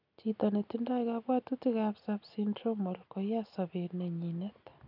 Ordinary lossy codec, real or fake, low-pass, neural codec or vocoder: none; real; 5.4 kHz; none